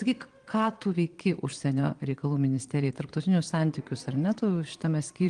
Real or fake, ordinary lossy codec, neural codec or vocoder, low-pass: fake; Opus, 24 kbps; vocoder, 22.05 kHz, 80 mel bands, Vocos; 9.9 kHz